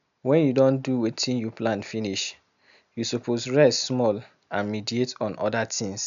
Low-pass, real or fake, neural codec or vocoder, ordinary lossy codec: 7.2 kHz; real; none; none